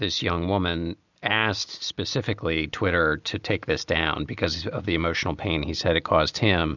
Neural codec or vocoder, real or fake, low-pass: none; real; 7.2 kHz